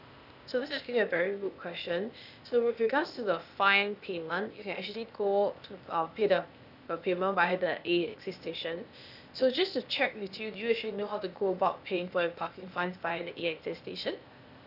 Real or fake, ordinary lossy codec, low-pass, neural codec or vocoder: fake; none; 5.4 kHz; codec, 16 kHz, 0.8 kbps, ZipCodec